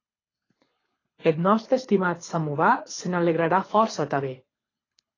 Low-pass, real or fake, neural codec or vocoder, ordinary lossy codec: 7.2 kHz; fake; codec, 24 kHz, 6 kbps, HILCodec; AAC, 32 kbps